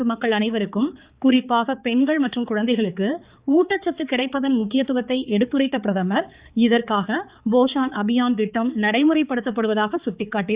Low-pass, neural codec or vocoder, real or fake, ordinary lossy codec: 3.6 kHz; codec, 16 kHz, 4 kbps, X-Codec, HuBERT features, trained on balanced general audio; fake; Opus, 64 kbps